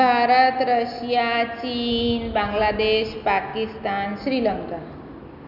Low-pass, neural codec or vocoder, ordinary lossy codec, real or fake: 5.4 kHz; none; none; real